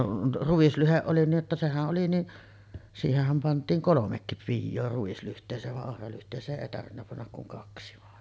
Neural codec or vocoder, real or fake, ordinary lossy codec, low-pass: none; real; none; none